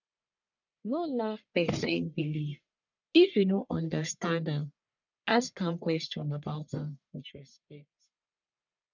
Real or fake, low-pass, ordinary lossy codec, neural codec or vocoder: fake; 7.2 kHz; none; codec, 44.1 kHz, 1.7 kbps, Pupu-Codec